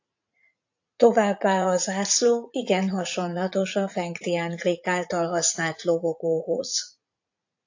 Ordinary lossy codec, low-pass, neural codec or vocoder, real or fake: AAC, 48 kbps; 7.2 kHz; vocoder, 44.1 kHz, 80 mel bands, Vocos; fake